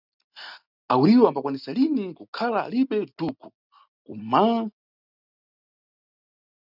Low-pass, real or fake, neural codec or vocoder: 5.4 kHz; real; none